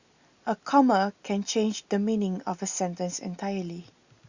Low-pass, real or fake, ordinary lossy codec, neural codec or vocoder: 7.2 kHz; real; Opus, 64 kbps; none